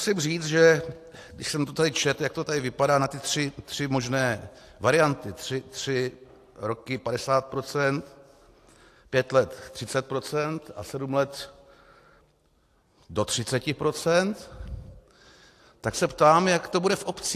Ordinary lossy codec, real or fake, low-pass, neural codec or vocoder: AAC, 64 kbps; real; 14.4 kHz; none